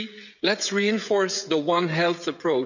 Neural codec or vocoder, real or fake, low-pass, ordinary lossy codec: codec, 16 kHz, 16 kbps, FreqCodec, smaller model; fake; 7.2 kHz; none